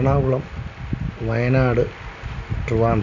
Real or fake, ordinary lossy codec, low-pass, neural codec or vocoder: real; none; 7.2 kHz; none